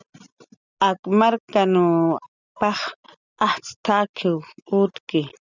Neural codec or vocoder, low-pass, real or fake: none; 7.2 kHz; real